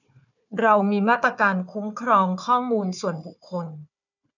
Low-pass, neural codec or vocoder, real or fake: 7.2 kHz; codec, 16 kHz, 4 kbps, FunCodec, trained on Chinese and English, 50 frames a second; fake